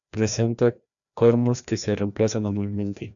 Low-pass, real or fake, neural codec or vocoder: 7.2 kHz; fake; codec, 16 kHz, 1 kbps, FreqCodec, larger model